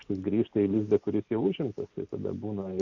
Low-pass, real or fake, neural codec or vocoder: 7.2 kHz; real; none